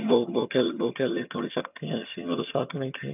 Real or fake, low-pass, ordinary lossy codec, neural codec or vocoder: fake; 3.6 kHz; none; vocoder, 22.05 kHz, 80 mel bands, HiFi-GAN